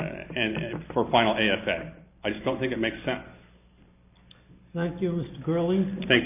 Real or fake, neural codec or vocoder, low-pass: fake; vocoder, 44.1 kHz, 128 mel bands every 256 samples, BigVGAN v2; 3.6 kHz